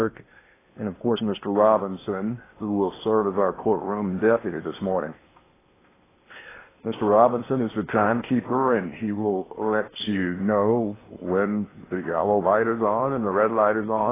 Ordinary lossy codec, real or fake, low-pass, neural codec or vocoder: AAC, 16 kbps; fake; 3.6 kHz; codec, 16 kHz in and 24 kHz out, 0.8 kbps, FocalCodec, streaming, 65536 codes